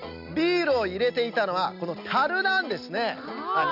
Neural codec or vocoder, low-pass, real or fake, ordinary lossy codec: none; 5.4 kHz; real; none